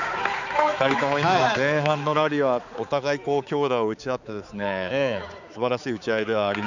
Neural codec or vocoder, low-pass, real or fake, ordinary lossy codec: codec, 16 kHz, 4 kbps, X-Codec, HuBERT features, trained on balanced general audio; 7.2 kHz; fake; none